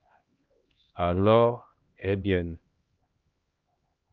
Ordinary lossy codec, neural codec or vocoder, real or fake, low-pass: Opus, 32 kbps; codec, 16 kHz, 1 kbps, X-Codec, HuBERT features, trained on LibriSpeech; fake; 7.2 kHz